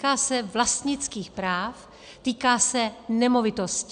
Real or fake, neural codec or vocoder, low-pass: real; none; 9.9 kHz